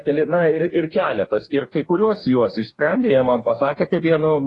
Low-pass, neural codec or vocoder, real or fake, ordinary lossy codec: 10.8 kHz; codec, 44.1 kHz, 2.6 kbps, DAC; fake; AAC, 32 kbps